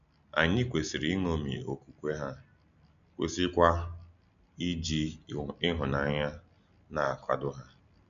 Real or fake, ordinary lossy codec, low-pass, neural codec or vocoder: real; none; 7.2 kHz; none